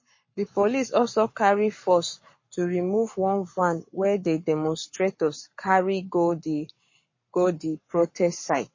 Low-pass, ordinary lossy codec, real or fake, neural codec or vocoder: 7.2 kHz; MP3, 32 kbps; fake; codec, 44.1 kHz, 7.8 kbps, Pupu-Codec